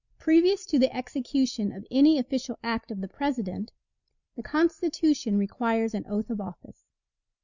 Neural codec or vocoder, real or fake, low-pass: none; real; 7.2 kHz